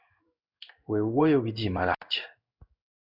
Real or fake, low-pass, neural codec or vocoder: fake; 5.4 kHz; codec, 16 kHz in and 24 kHz out, 1 kbps, XY-Tokenizer